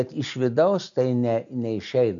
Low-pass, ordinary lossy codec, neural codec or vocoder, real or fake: 7.2 kHz; AAC, 64 kbps; none; real